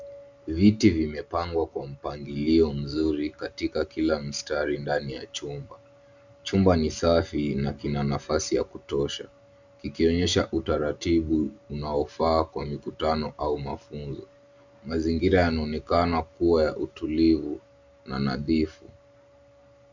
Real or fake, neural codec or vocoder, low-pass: real; none; 7.2 kHz